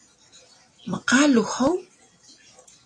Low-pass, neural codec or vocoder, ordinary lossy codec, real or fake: 9.9 kHz; none; AAC, 32 kbps; real